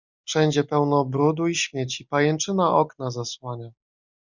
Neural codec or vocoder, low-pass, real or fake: none; 7.2 kHz; real